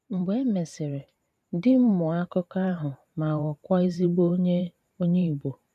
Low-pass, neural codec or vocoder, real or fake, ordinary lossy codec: 14.4 kHz; vocoder, 44.1 kHz, 128 mel bands every 512 samples, BigVGAN v2; fake; none